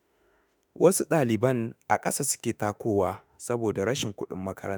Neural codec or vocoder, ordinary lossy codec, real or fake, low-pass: autoencoder, 48 kHz, 32 numbers a frame, DAC-VAE, trained on Japanese speech; none; fake; none